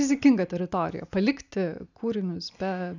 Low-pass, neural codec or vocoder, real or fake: 7.2 kHz; none; real